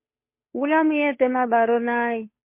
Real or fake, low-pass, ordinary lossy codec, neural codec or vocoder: fake; 3.6 kHz; MP3, 24 kbps; codec, 16 kHz, 2 kbps, FunCodec, trained on Chinese and English, 25 frames a second